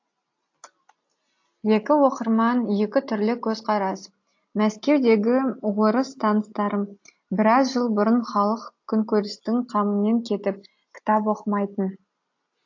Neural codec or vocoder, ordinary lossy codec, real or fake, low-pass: none; AAC, 48 kbps; real; 7.2 kHz